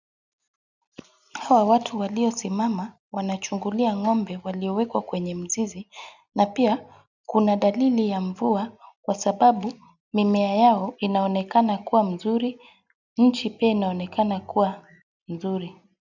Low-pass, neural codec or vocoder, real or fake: 7.2 kHz; none; real